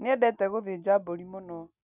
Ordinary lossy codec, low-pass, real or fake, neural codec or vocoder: none; 3.6 kHz; real; none